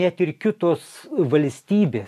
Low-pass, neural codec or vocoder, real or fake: 14.4 kHz; none; real